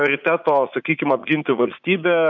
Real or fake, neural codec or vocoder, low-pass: fake; vocoder, 44.1 kHz, 80 mel bands, Vocos; 7.2 kHz